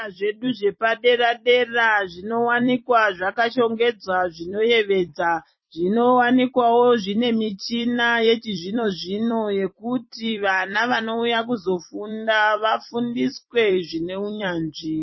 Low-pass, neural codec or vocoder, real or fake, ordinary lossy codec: 7.2 kHz; vocoder, 44.1 kHz, 128 mel bands every 256 samples, BigVGAN v2; fake; MP3, 24 kbps